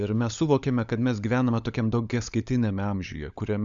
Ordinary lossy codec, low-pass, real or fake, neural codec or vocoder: Opus, 64 kbps; 7.2 kHz; fake; codec, 16 kHz, 16 kbps, FunCodec, trained on LibriTTS, 50 frames a second